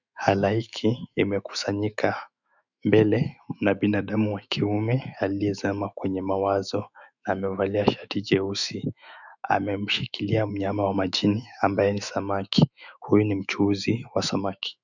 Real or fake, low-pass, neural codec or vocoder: fake; 7.2 kHz; autoencoder, 48 kHz, 128 numbers a frame, DAC-VAE, trained on Japanese speech